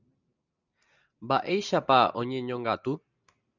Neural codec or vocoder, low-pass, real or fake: none; 7.2 kHz; real